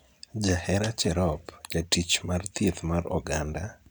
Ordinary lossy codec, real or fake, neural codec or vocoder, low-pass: none; real; none; none